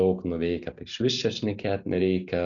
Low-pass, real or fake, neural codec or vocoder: 7.2 kHz; real; none